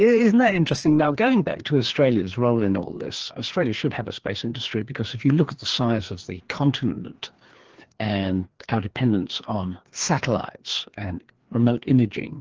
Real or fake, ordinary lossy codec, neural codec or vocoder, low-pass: fake; Opus, 16 kbps; codec, 16 kHz, 2 kbps, FreqCodec, larger model; 7.2 kHz